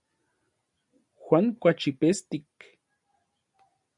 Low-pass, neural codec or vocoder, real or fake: 10.8 kHz; none; real